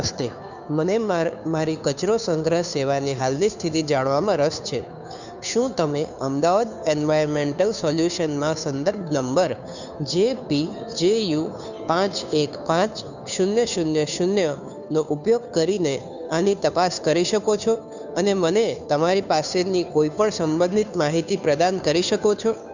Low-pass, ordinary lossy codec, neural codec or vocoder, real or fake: 7.2 kHz; none; codec, 16 kHz, 2 kbps, FunCodec, trained on Chinese and English, 25 frames a second; fake